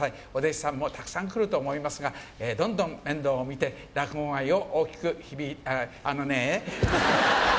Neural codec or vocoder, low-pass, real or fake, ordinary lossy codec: none; none; real; none